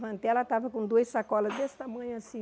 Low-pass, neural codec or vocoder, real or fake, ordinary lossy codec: none; none; real; none